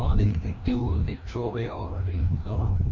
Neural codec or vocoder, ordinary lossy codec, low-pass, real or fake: codec, 16 kHz, 1 kbps, FreqCodec, larger model; MP3, 48 kbps; 7.2 kHz; fake